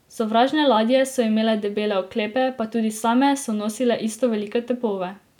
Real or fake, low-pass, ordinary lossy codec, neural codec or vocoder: real; 19.8 kHz; none; none